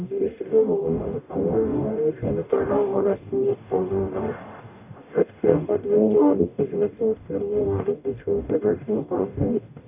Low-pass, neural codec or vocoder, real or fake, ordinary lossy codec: 3.6 kHz; codec, 44.1 kHz, 0.9 kbps, DAC; fake; none